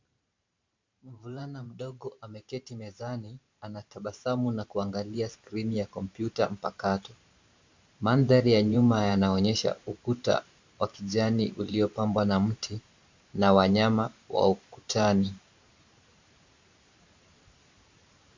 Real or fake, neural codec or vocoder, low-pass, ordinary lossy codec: fake; vocoder, 44.1 kHz, 128 mel bands every 512 samples, BigVGAN v2; 7.2 kHz; MP3, 64 kbps